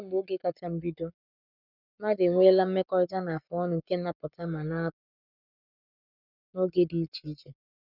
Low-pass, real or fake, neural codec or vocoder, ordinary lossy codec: 5.4 kHz; fake; codec, 44.1 kHz, 7.8 kbps, Pupu-Codec; none